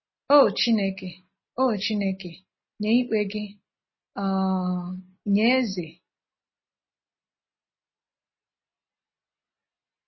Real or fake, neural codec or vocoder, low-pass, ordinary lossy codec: real; none; 7.2 kHz; MP3, 24 kbps